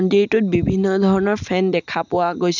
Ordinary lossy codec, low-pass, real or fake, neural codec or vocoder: none; 7.2 kHz; real; none